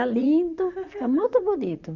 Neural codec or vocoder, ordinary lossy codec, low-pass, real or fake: vocoder, 44.1 kHz, 128 mel bands, Pupu-Vocoder; none; 7.2 kHz; fake